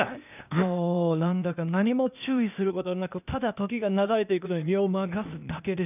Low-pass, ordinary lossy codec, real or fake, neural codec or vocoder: 3.6 kHz; none; fake; codec, 16 kHz, 0.8 kbps, ZipCodec